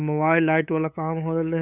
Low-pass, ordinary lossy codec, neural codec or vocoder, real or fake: 3.6 kHz; none; codec, 16 kHz, 8 kbps, FunCodec, trained on LibriTTS, 25 frames a second; fake